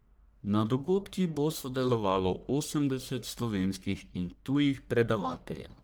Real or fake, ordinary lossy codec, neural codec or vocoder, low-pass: fake; none; codec, 44.1 kHz, 1.7 kbps, Pupu-Codec; none